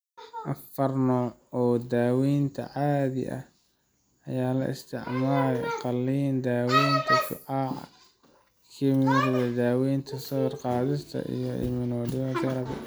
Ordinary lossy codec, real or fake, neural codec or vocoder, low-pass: none; real; none; none